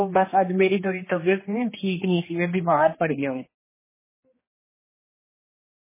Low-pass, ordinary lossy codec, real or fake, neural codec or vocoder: 3.6 kHz; MP3, 16 kbps; fake; codec, 16 kHz, 2 kbps, X-Codec, HuBERT features, trained on general audio